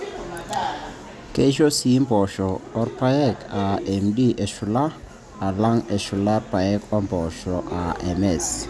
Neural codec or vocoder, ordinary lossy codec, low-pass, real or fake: none; none; none; real